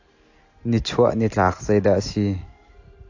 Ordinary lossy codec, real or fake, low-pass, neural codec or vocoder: AAC, 48 kbps; real; 7.2 kHz; none